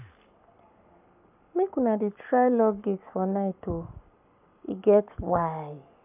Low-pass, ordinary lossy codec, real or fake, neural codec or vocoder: 3.6 kHz; none; fake; codec, 44.1 kHz, 7.8 kbps, Pupu-Codec